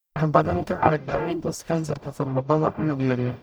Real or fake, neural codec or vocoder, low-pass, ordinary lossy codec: fake; codec, 44.1 kHz, 0.9 kbps, DAC; none; none